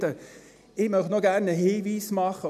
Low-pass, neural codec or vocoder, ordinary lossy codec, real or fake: 14.4 kHz; none; none; real